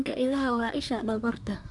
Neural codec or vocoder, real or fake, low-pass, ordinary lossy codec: codec, 24 kHz, 1 kbps, SNAC; fake; 10.8 kHz; none